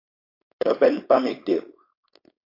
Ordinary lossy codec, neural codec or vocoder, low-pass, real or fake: AAC, 32 kbps; codec, 16 kHz, 4.8 kbps, FACodec; 5.4 kHz; fake